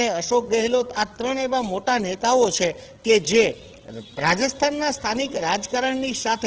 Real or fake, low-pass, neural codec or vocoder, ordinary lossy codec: fake; 7.2 kHz; codec, 16 kHz, 8 kbps, FreqCodec, larger model; Opus, 16 kbps